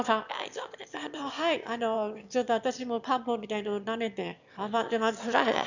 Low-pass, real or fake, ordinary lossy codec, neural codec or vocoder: 7.2 kHz; fake; none; autoencoder, 22.05 kHz, a latent of 192 numbers a frame, VITS, trained on one speaker